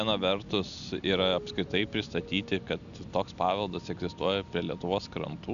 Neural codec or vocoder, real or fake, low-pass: none; real; 7.2 kHz